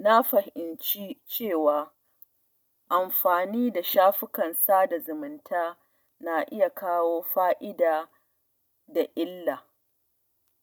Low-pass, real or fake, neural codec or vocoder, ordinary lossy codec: none; real; none; none